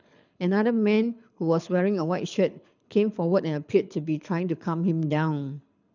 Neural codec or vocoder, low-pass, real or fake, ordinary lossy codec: codec, 24 kHz, 6 kbps, HILCodec; 7.2 kHz; fake; none